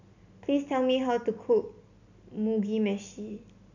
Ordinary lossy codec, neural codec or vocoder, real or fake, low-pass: none; none; real; 7.2 kHz